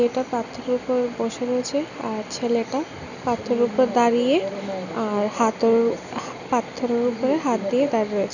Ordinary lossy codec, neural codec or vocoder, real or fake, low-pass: none; none; real; 7.2 kHz